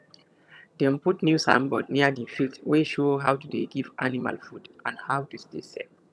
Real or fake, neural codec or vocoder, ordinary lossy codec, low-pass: fake; vocoder, 22.05 kHz, 80 mel bands, HiFi-GAN; none; none